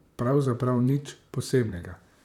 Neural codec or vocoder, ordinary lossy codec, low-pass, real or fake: vocoder, 44.1 kHz, 128 mel bands, Pupu-Vocoder; none; 19.8 kHz; fake